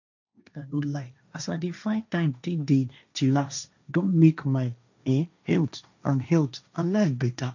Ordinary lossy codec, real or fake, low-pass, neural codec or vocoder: none; fake; 7.2 kHz; codec, 16 kHz, 1.1 kbps, Voila-Tokenizer